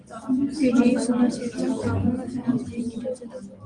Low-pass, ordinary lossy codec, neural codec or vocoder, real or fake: 9.9 kHz; Opus, 24 kbps; none; real